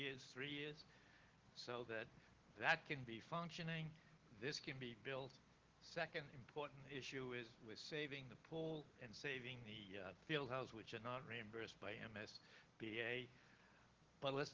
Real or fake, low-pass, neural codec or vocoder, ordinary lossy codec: real; 7.2 kHz; none; Opus, 16 kbps